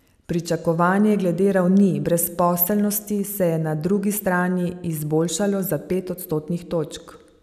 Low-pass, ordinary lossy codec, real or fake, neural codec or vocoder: 14.4 kHz; none; real; none